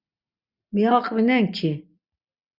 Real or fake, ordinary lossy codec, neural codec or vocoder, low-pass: real; Opus, 64 kbps; none; 5.4 kHz